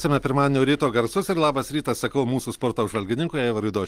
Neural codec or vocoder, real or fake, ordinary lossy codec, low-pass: none; real; Opus, 24 kbps; 14.4 kHz